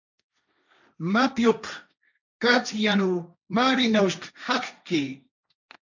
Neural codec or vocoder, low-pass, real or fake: codec, 16 kHz, 1.1 kbps, Voila-Tokenizer; 7.2 kHz; fake